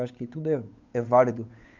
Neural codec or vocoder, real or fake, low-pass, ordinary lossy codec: codec, 16 kHz, 8 kbps, FunCodec, trained on Chinese and English, 25 frames a second; fake; 7.2 kHz; MP3, 64 kbps